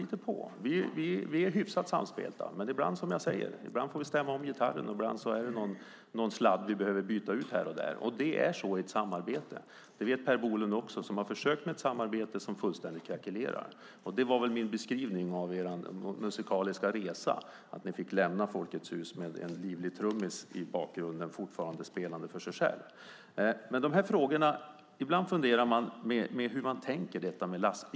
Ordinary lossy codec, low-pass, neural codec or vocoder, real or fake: none; none; none; real